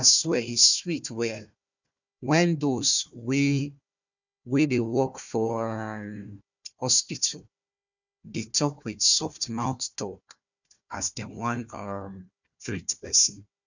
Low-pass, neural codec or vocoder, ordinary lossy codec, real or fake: 7.2 kHz; codec, 16 kHz, 1 kbps, FunCodec, trained on Chinese and English, 50 frames a second; none; fake